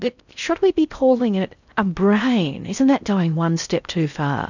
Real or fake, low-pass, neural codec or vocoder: fake; 7.2 kHz; codec, 16 kHz in and 24 kHz out, 0.6 kbps, FocalCodec, streaming, 4096 codes